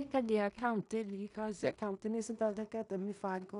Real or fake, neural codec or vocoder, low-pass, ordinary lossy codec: fake; codec, 16 kHz in and 24 kHz out, 0.4 kbps, LongCat-Audio-Codec, two codebook decoder; 10.8 kHz; none